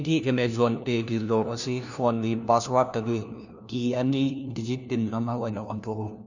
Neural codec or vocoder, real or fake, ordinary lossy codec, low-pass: codec, 16 kHz, 1 kbps, FunCodec, trained on LibriTTS, 50 frames a second; fake; none; 7.2 kHz